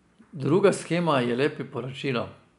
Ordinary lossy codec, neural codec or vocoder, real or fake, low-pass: none; none; real; 10.8 kHz